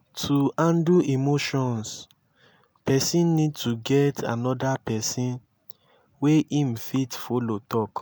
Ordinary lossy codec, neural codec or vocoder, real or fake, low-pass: none; none; real; none